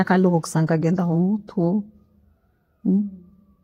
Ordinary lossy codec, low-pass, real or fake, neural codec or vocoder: AAC, 48 kbps; 19.8 kHz; fake; vocoder, 48 kHz, 128 mel bands, Vocos